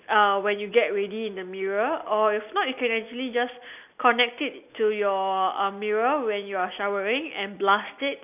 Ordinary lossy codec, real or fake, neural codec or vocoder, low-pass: none; real; none; 3.6 kHz